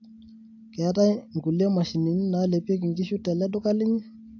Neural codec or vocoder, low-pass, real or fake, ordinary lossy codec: none; 7.2 kHz; real; AAC, 48 kbps